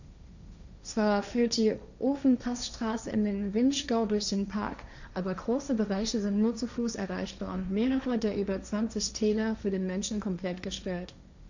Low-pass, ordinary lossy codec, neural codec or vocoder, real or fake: 7.2 kHz; none; codec, 16 kHz, 1.1 kbps, Voila-Tokenizer; fake